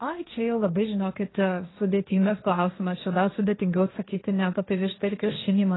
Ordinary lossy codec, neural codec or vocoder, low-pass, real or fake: AAC, 16 kbps; codec, 16 kHz, 1.1 kbps, Voila-Tokenizer; 7.2 kHz; fake